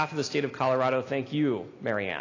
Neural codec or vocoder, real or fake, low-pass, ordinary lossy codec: none; real; 7.2 kHz; AAC, 32 kbps